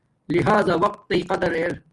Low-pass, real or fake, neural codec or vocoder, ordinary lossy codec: 10.8 kHz; real; none; Opus, 32 kbps